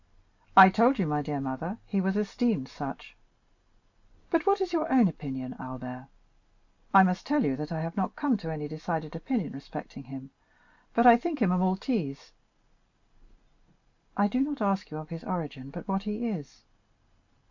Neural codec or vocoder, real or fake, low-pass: none; real; 7.2 kHz